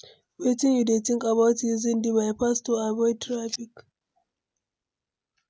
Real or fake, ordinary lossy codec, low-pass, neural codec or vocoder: real; none; none; none